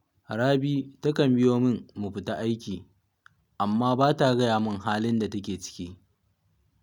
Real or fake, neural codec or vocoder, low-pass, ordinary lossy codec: real; none; 19.8 kHz; none